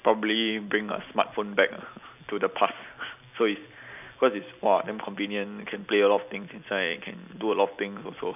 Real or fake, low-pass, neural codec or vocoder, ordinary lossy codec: real; 3.6 kHz; none; none